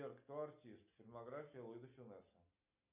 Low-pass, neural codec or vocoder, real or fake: 3.6 kHz; none; real